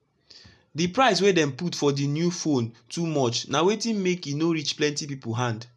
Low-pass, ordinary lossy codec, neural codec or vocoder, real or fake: none; none; none; real